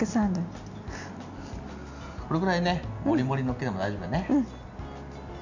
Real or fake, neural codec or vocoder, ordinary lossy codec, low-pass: real; none; none; 7.2 kHz